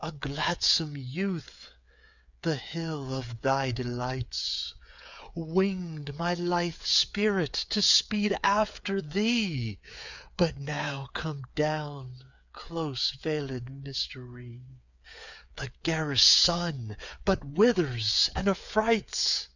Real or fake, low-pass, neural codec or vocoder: fake; 7.2 kHz; codec, 16 kHz, 8 kbps, FreqCodec, smaller model